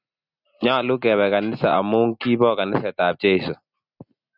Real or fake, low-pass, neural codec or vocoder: real; 5.4 kHz; none